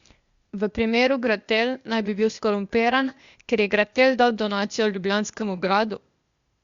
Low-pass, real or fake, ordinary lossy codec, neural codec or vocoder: 7.2 kHz; fake; Opus, 64 kbps; codec, 16 kHz, 0.8 kbps, ZipCodec